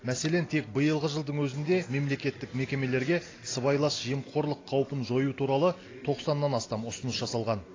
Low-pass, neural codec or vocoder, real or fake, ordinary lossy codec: 7.2 kHz; none; real; AAC, 32 kbps